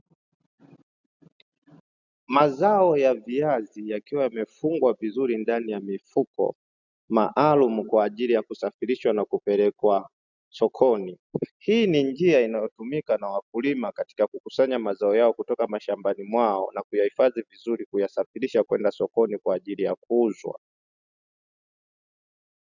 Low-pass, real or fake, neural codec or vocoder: 7.2 kHz; real; none